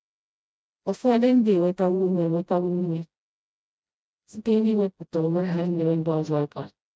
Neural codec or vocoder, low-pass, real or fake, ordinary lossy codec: codec, 16 kHz, 0.5 kbps, FreqCodec, smaller model; none; fake; none